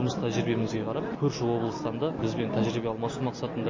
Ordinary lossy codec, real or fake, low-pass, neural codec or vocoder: MP3, 32 kbps; real; 7.2 kHz; none